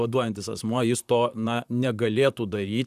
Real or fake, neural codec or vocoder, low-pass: fake; vocoder, 44.1 kHz, 128 mel bands, Pupu-Vocoder; 14.4 kHz